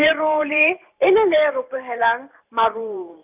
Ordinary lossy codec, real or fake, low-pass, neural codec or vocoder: none; fake; 3.6 kHz; vocoder, 44.1 kHz, 128 mel bands every 512 samples, BigVGAN v2